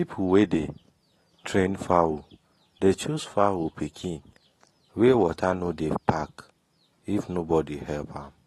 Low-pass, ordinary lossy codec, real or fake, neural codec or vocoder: 19.8 kHz; AAC, 32 kbps; real; none